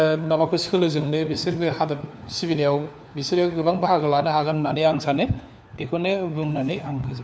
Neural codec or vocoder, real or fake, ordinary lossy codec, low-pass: codec, 16 kHz, 4 kbps, FunCodec, trained on LibriTTS, 50 frames a second; fake; none; none